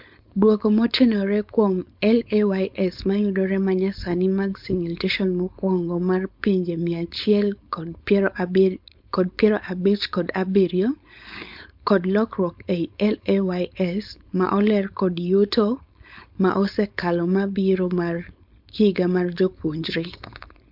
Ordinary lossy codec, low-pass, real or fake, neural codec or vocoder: MP3, 48 kbps; 5.4 kHz; fake; codec, 16 kHz, 4.8 kbps, FACodec